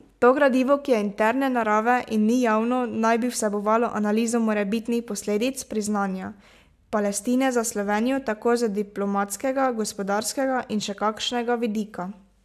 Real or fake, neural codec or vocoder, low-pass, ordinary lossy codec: real; none; 14.4 kHz; AAC, 96 kbps